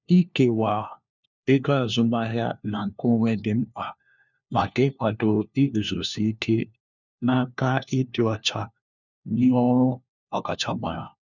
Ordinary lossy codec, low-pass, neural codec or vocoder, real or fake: none; 7.2 kHz; codec, 16 kHz, 1 kbps, FunCodec, trained on LibriTTS, 50 frames a second; fake